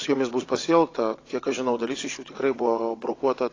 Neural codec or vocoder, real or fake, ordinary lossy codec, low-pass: vocoder, 22.05 kHz, 80 mel bands, WaveNeXt; fake; AAC, 32 kbps; 7.2 kHz